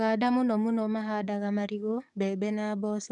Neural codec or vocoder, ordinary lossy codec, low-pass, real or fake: codec, 44.1 kHz, 7.8 kbps, DAC; none; 10.8 kHz; fake